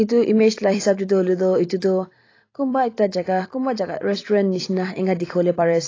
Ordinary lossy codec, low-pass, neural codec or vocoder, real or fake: AAC, 32 kbps; 7.2 kHz; vocoder, 44.1 kHz, 128 mel bands every 256 samples, BigVGAN v2; fake